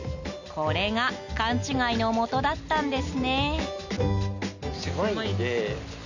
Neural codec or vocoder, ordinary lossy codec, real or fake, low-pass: none; none; real; 7.2 kHz